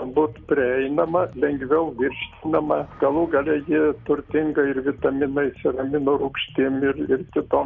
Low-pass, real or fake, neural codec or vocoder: 7.2 kHz; real; none